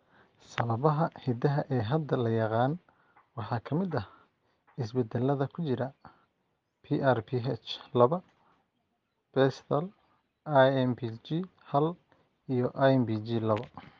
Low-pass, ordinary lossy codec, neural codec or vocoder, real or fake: 7.2 kHz; Opus, 32 kbps; none; real